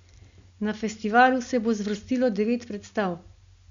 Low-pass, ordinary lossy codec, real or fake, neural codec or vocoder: 7.2 kHz; Opus, 64 kbps; real; none